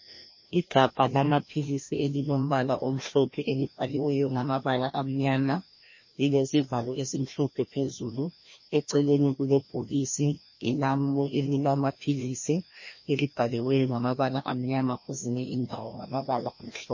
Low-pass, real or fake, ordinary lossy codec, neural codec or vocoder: 7.2 kHz; fake; MP3, 32 kbps; codec, 16 kHz, 1 kbps, FreqCodec, larger model